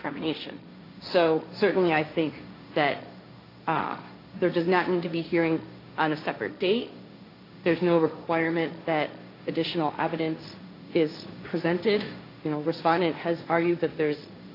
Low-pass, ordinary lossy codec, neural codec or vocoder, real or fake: 5.4 kHz; AAC, 32 kbps; codec, 16 kHz, 1.1 kbps, Voila-Tokenizer; fake